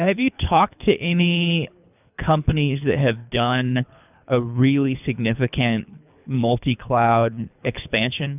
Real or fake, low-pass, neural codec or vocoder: fake; 3.6 kHz; codec, 24 kHz, 3 kbps, HILCodec